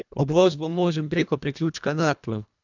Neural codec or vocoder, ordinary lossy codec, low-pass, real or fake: codec, 24 kHz, 1.5 kbps, HILCodec; none; 7.2 kHz; fake